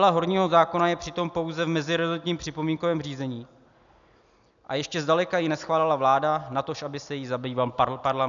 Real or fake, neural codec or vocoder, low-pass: real; none; 7.2 kHz